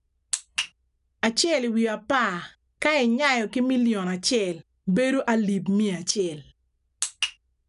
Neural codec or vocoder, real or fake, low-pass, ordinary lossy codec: none; real; 10.8 kHz; none